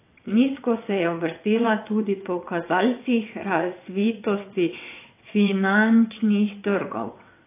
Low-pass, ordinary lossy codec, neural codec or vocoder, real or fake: 3.6 kHz; AAC, 24 kbps; vocoder, 22.05 kHz, 80 mel bands, WaveNeXt; fake